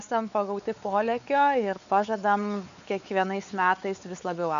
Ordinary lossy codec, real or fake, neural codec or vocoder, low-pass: MP3, 96 kbps; fake; codec, 16 kHz, 4 kbps, X-Codec, WavLM features, trained on Multilingual LibriSpeech; 7.2 kHz